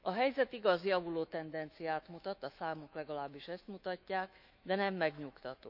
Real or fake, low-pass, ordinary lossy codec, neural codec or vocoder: fake; 5.4 kHz; none; autoencoder, 48 kHz, 128 numbers a frame, DAC-VAE, trained on Japanese speech